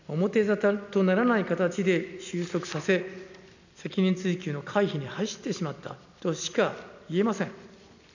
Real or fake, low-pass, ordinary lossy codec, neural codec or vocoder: real; 7.2 kHz; none; none